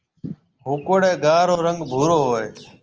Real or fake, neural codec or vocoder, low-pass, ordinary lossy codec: real; none; 7.2 kHz; Opus, 24 kbps